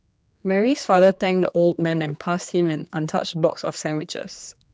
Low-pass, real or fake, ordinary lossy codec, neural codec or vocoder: none; fake; none; codec, 16 kHz, 2 kbps, X-Codec, HuBERT features, trained on general audio